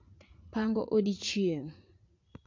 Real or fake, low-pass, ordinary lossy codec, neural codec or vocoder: fake; 7.2 kHz; MP3, 48 kbps; vocoder, 44.1 kHz, 80 mel bands, Vocos